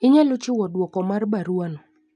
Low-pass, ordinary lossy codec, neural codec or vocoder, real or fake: 9.9 kHz; none; none; real